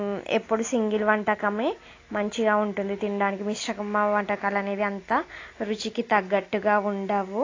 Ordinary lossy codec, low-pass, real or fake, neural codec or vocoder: AAC, 32 kbps; 7.2 kHz; real; none